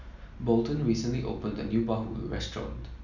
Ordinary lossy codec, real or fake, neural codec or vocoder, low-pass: none; real; none; 7.2 kHz